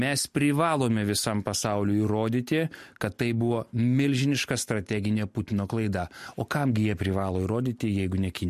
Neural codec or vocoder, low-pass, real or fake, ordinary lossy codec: none; 14.4 kHz; real; MP3, 64 kbps